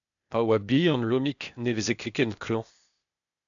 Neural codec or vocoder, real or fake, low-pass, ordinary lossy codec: codec, 16 kHz, 0.8 kbps, ZipCodec; fake; 7.2 kHz; MP3, 96 kbps